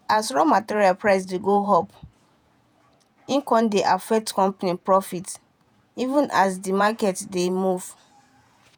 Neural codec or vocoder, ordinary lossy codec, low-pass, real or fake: vocoder, 48 kHz, 128 mel bands, Vocos; none; none; fake